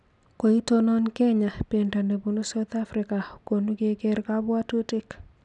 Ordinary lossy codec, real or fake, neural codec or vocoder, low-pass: none; real; none; 10.8 kHz